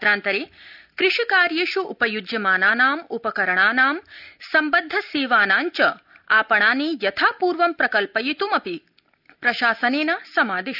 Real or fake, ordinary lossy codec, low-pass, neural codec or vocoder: real; none; 5.4 kHz; none